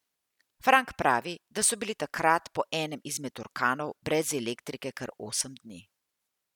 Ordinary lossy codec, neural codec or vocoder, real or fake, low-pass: none; none; real; 19.8 kHz